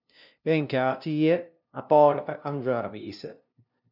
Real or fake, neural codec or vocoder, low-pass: fake; codec, 16 kHz, 0.5 kbps, FunCodec, trained on LibriTTS, 25 frames a second; 5.4 kHz